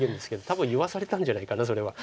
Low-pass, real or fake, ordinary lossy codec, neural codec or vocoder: none; real; none; none